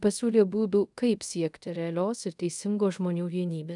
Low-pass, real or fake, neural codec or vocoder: 10.8 kHz; fake; codec, 24 kHz, 0.5 kbps, DualCodec